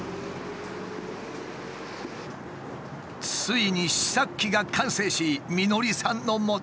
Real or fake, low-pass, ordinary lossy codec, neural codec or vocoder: real; none; none; none